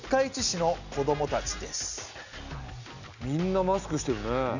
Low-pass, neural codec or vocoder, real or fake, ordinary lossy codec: 7.2 kHz; none; real; none